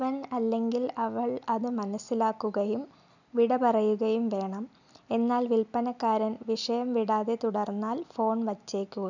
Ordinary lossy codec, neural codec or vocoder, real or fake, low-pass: none; none; real; 7.2 kHz